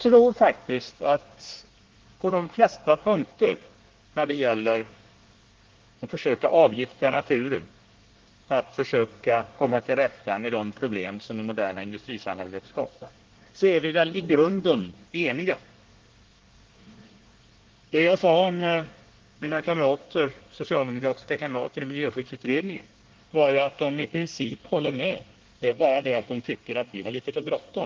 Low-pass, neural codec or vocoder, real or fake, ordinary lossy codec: 7.2 kHz; codec, 24 kHz, 1 kbps, SNAC; fake; Opus, 16 kbps